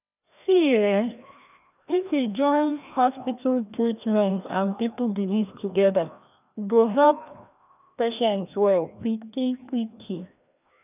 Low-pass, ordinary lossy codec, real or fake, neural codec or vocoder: 3.6 kHz; none; fake; codec, 16 kHz, 1 kbps, FreqCodec, larger model